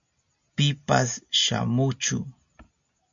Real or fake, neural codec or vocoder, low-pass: real; none; 7.2 kHz